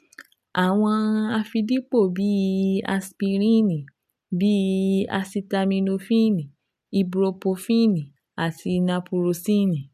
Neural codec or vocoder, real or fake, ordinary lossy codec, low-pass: none; real; none; 14.4 kHz